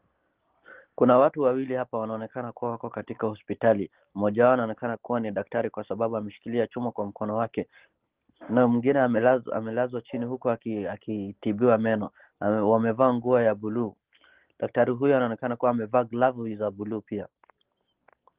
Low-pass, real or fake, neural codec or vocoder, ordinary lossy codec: 3.6 kHz; real; none; Opus, 16 kbps